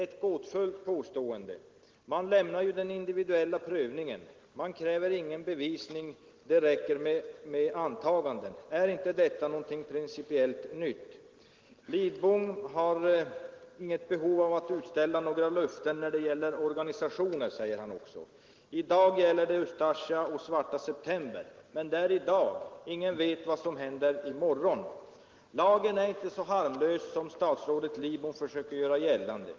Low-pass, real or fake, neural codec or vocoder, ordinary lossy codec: 7.2 kHz; real; none; Opus, 16 kbps